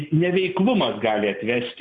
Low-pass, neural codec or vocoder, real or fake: 9.9 kHz; none; real